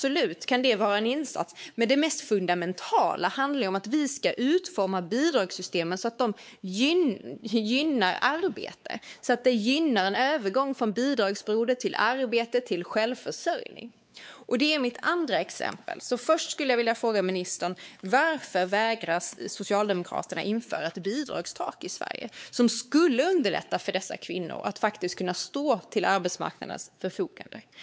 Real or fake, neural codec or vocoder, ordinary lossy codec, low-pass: fake; codec, 16 kHz, 4 kbps, X-Codec, WavLM features, trained on Multilingual LibriSpeech; none; none